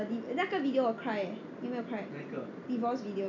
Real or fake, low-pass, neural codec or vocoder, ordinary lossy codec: real; 7.2 kHz; none; none